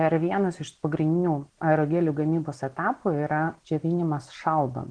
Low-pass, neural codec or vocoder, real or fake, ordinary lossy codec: 9.9 kHz; none; real; Opus, 16 kbps